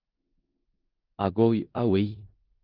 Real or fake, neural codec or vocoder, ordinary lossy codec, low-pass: fake; codec, 16 kHz in and 24 kHz out, 0.4 kbps, LongCat-Audio-Codec, four codebook decoder; Opus, 24 kbps; 5.4 kHz